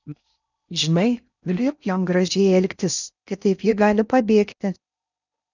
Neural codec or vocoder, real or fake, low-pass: codec, 16 kHz in and 24 kHz out, 0.6 kbps, FocalCodec, streaming, 4096 codes; fake; 7.2 kHz